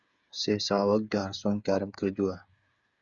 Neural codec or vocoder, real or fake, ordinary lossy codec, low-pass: codec, 16 kHz, 8 kbps, FreqCodec, smaller model; fake; Opus, 64 kbps; 7.2 kHz